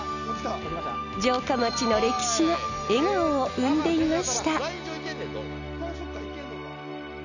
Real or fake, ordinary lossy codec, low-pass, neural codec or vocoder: real; none; 7.2 kHz; none